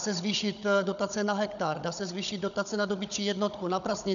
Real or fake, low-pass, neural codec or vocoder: fake; 7.2 kHz; codec, 16 kHz, 16 kbps, FunCodec, trained on Chinese and English, 50 frames a second